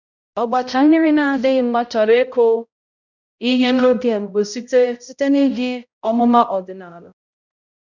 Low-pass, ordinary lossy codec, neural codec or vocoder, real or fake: 7.2 kHz; none; codec, 16 kHz, 0.5 kbps, X-Codec, HuBERT features, trained on balanced general audio; fake